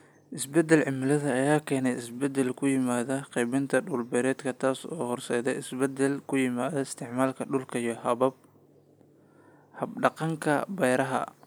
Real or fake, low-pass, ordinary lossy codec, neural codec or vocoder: real; none; none; none